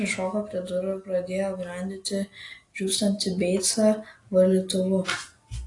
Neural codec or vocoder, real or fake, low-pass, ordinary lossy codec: none; real; 10.8 kHz; AAC, 48 kbps